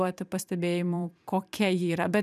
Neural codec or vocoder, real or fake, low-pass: vocoder, 44.1 kHz, 128 mel bands every 256 samples, BigVGAN v2; fake; 14.4 kHz